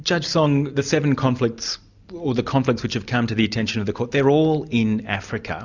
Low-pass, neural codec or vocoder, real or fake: 7.2 kHz; none; real